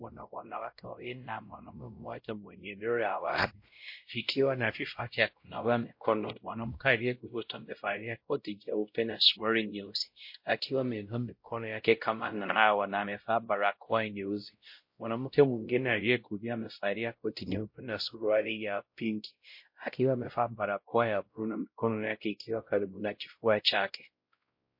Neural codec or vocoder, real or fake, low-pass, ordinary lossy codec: codec, 16 kHz, 0.5 kbps, X-Codec, WavLM features, trained on Multilingual LibriSpeech; fake; 5.4 kHz; MP3, 32 kbps